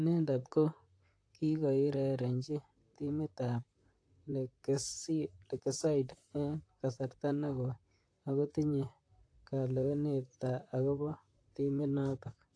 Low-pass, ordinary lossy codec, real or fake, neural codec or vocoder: 9.9 kHz; AAC, 48 kbps; fake; vocoder, 44.1 kHz, 128 mel bands, Pupu-Vocoder